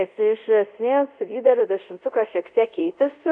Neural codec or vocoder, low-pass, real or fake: codec, 24 kHz, 0.5 kbps, DualCodec; 9.9 kHz; fake